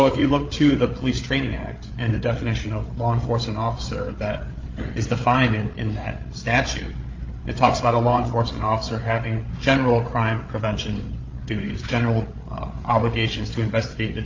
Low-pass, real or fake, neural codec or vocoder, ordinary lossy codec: 7.2 kHz; fake; codec, 16 kHz, 8 kbps, FreqCodec, larger model; Opus, 24 kbps